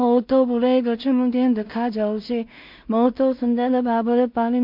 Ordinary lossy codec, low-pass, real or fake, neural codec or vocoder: MP3, 48 kbps; 5.4 kHz; fake; codec, 16 kHz in and 24 kHz out, 0.4 kbps, LongCat-Audio-Codec, two codebook decoder